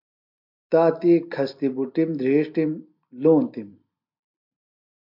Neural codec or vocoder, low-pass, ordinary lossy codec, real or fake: none; 5.4 kHz; MP3, 48 kbps; real